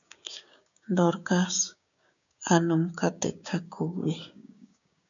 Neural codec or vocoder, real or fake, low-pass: codec, 16 kHz, 6 kbps, DAC; fake; 7.2 kHz